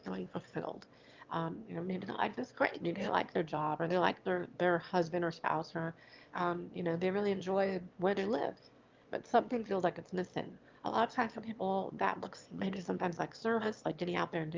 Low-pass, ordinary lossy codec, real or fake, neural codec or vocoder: 7.2 kHz; Opus, 24 kbps; fake; autoencoder, 22.05 kHz, a latent of 192 numbers a frame, VITS, trained on one speaker